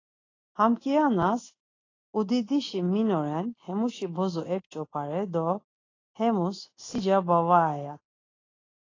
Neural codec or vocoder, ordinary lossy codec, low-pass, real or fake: none; AAC, 32 kbps; 7.2 kHz; real